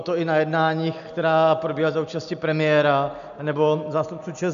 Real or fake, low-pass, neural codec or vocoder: real; 7.2 kHz; none